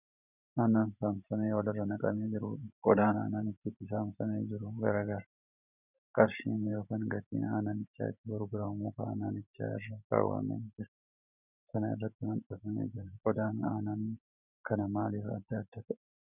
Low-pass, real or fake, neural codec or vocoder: 3.6 kHz; fake; vocoder, 44.1 kHz, 128 mel bands every 512 samples, BigVGAN v2